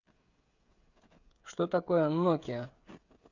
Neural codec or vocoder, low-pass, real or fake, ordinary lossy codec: codec, 16 kHz, 16 kbps, FreqCodec, smaller model; 7.2 kHz; fake; Opus, 64 kbps